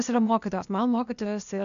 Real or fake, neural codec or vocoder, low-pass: fake; codec, 16 kHz, 0.8 kbps, ZipCodec; 7.2 kHz